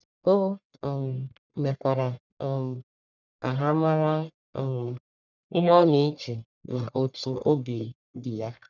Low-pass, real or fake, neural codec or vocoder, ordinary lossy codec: 7.2 kHz; fake; codec, 44.1 kHz, 1.7 kbps, Pupu-Codec; none